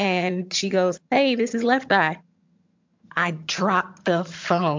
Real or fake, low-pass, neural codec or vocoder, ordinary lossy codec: fake; 7.2 kHz; vocoder, 22.05 kHz, 80 mel bands, HiFi-GAN; MP3, 64 kbps